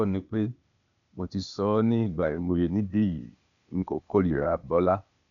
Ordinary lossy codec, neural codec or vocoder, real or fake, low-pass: none; codec, 16 kHz, 0.8 kbps, ZipCodec; fake; 7.2 kHz